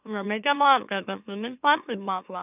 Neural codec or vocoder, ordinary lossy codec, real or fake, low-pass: autoencoder, 44.1 kHz, a latent of 192 numbers a frame, MeloTTS; none; fake; 3.6 kHz